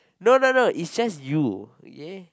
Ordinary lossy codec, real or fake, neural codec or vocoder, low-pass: none; real; none; none